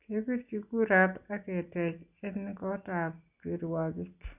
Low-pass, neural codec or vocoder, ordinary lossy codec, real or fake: 3.6 kHz; none; none; real